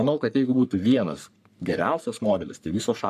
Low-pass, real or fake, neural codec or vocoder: 14.4 kHz; fake; codec, 44.1 kHz, 3.4 kbps, Pupu-Codec